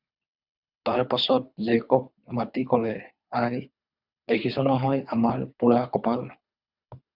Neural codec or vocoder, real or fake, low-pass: codec, 24 kHz, 3 kbps, HILCodec; fake; 5.4 kHz